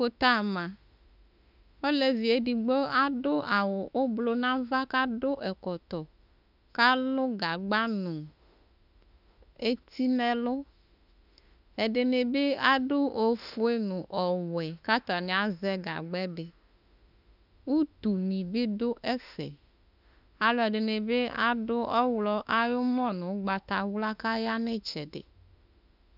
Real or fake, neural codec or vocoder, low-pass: fake; autoencoder, 48 kHz, 32 numbers a frame, DAC-VAE, trained on Japanese speech; 5.4 kHz